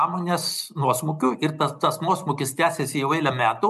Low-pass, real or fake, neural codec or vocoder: 14.4 kHz; real; none